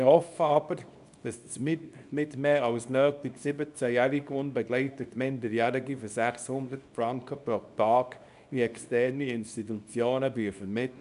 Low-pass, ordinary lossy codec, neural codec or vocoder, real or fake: 10.8 kHz; none; codec, 24 kHz, 0.9 kbps, WavTokenizer, small release; fake